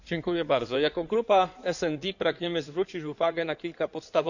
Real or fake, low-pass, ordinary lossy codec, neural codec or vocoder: fake; 7.2 kHz; none; codec, 16 kHz in and 24 kHz out, 2.2 kbps, FireRedTTS-2 codec